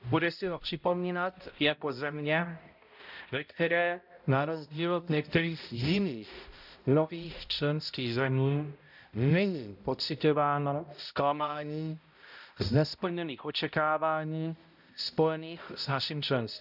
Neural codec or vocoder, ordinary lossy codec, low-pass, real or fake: codec, 16 kHz, 0.5 kbps, X-Codec, HuBERT features, trained on balanced general audio; none; 5.4 kHz; fake